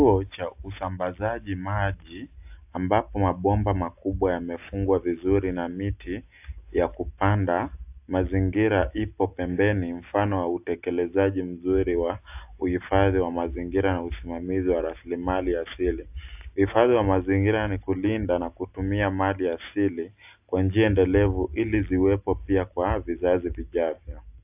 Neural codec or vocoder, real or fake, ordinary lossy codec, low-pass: none; real; AAC, 32 kbps; 3.6 kHz